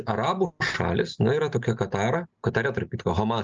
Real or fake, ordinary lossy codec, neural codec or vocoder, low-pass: real; Opus, 24 kbps; none; 7.2 kHz